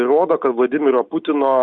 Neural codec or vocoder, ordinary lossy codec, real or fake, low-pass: none; Opus, 64 kbps; real; 9.9 kHz